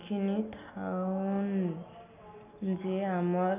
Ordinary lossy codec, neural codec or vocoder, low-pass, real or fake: none; none; 3.6 kHz; real